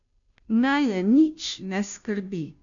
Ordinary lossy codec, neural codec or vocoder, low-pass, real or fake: none; codec, 16 kHz, 0.5 kbps, FunCodec, trained on Chinese and English, 25 frames a second; 7.2 kHz; fake